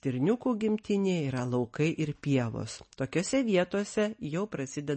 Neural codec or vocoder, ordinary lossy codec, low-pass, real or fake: none; MP3, 32 kbps; 10.8 kHz; real